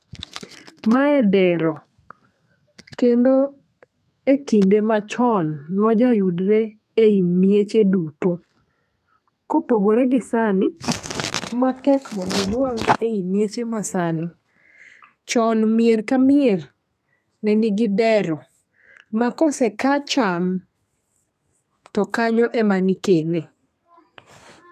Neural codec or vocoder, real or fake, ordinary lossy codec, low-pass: codec, 32 kHz, 1.9 kbps, SNAC; fake; none; 14.4 kHz